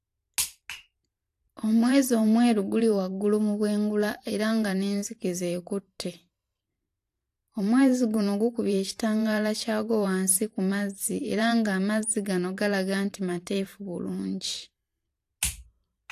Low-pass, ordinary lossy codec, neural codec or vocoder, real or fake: 14.4 kHz; AAC, 64 kbps; vocoder, 44.1 kHz, 128 mel bands every 512 samples, BigVGAN v2; fake